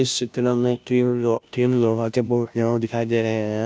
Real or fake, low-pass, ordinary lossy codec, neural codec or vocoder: fake; none; none; codec, 16 kHz, 0.5 kbps, FunCodec, trained on Chinese and English, 25 frames a second